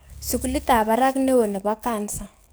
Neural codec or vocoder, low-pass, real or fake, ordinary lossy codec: codec, 44.1 kHz, 7.8 kbps, DAC; none; fake; none